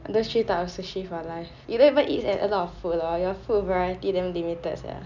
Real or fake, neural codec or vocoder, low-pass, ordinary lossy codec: real; none; 7.2 kHz; none